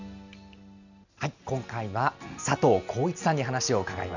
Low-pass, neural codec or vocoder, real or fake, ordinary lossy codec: 7.2 kHz; none; real; none